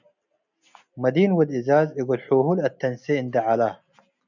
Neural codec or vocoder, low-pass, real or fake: none; 7.2 kHz; real